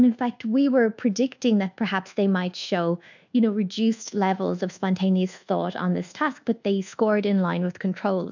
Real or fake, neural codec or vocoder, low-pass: fake; codec, 24 kHz, 1.2 kbps, DualCodec; 7.2 kHz